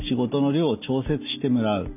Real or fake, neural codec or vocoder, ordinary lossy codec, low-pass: real; none; none; 3.6 kHz